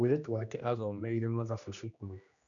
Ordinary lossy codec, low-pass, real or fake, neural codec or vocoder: none; 7.2 kHz; fake; codec, 16 kHz, 1 kbps, X-Codec, HuBERT features, trained on general audio